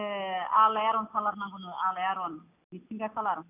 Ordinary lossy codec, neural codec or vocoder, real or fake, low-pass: none; none; real; 3.6 kHz